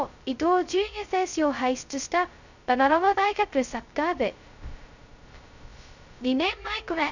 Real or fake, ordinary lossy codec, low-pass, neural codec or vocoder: fake; none; 7.2 kHz; codec, 16 kHz, 0.2 kbps, FocalCodec